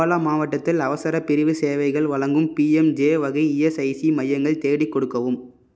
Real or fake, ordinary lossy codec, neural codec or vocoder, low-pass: real; none; none; none